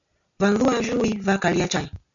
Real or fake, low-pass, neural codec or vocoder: real; 7.2 kHz; none